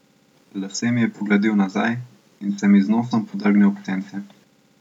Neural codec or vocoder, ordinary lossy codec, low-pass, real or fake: none; none; 19.8 kHz; real